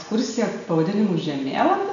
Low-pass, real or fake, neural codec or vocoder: 7.2 kHz; real; none